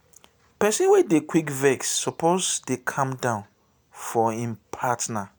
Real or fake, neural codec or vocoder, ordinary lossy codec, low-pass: real; none; none; none